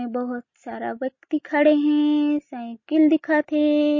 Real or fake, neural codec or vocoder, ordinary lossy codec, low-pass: real; none; MP3, 32 kbps; 7.2 kHz